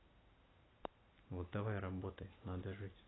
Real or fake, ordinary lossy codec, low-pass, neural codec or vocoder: real; AAC, 16 kbps; 7.2 kHz; none